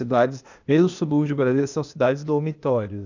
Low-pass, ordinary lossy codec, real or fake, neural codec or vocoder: 7.2 kHz; none; fake; codec, 16 kHz, 0.8 kbps, ZipCodec